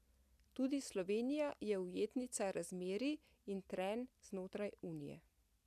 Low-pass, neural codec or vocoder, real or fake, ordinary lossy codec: 14.4 kHz; none; real; none